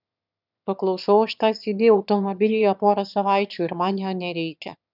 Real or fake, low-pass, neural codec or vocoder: fake; 5.4 kHz; autoencoder, 22.05 kHz, a latent of 192 numbers a frame, VITS, trained on one speaker